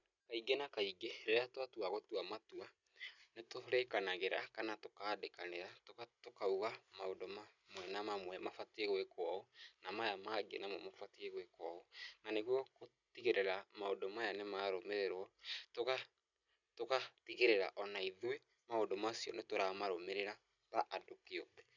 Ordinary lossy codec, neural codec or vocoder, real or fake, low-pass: none; none; real; 7.2 kHz